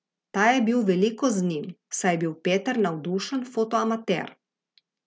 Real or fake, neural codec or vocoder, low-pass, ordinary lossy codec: real; none; none; none